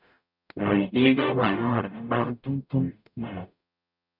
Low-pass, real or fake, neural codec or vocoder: 5.4 kHz; fake; codec, 44.1 kHz, 0.9 kbps, DAC